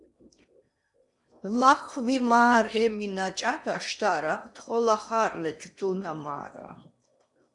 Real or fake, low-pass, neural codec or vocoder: fake; 10.8 kHz; codec, 16 kHz in and 24 kHz out, 0.8 kbps, FocalCodec, streaming, 65536 codes